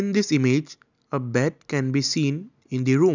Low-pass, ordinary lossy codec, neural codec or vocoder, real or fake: 7.2 kHz; none; none; real